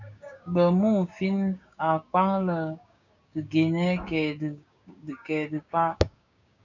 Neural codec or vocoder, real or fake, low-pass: codec, 16 kHz, 6 kbps, DAC; fake; 7.2 kHz